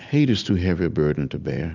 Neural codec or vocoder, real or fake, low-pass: none; real; 7.2 kHz